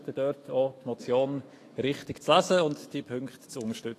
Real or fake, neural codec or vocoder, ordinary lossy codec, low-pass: fake; autoencoder, 48 kHz, 128 numbers a frame, DAC-VAE, trained on Japanese speech; AAC, 48 kbps; 14.4 kHz